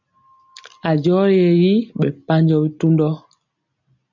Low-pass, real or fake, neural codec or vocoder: 7.2 kHz; real; none